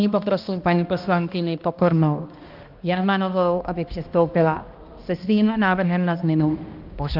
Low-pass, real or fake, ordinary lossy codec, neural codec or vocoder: 5.4 kHz; fake; Opus, 24 kbps; codec, 16 kHz, 1 kbps, X-Codec, HuBERT features, trained on balanced general audio